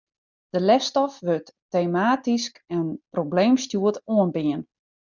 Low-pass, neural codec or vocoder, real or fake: 7.2 kHz; none; real